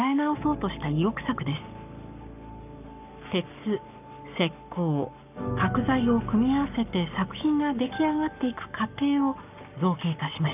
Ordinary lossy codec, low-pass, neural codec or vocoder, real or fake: none; 3.6 kHz; codec, 44.1 kHz, 7.8 kbps, Pupu-Codec; fake